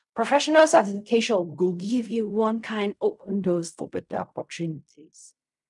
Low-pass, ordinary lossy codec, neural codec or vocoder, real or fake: 10.8 kHz; none; codec, 16 kHz in and 24 kHz out, 0.4 kbps, LongCat-Audio-Codec, fine tuned four codebook decoder; fake